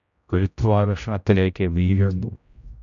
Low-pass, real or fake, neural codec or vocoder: 7.2 kHz; fake; codec, 16 kHz, 0.5 kbps, X-Codec, HuBERT features, trained on general audio